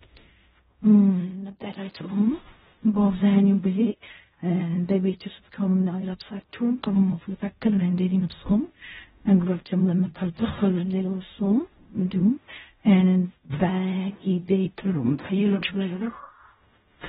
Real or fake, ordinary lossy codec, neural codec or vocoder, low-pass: fake; AAC, 16 kbps; codec, 16 kHz in and 24 kHz out, 0.4 kbps, LongCat-Audio-Codec, fine tuned four codebook decoder; 10.8 kHz